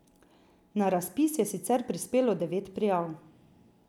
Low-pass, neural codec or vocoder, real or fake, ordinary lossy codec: 19.8 kHz; none; real; none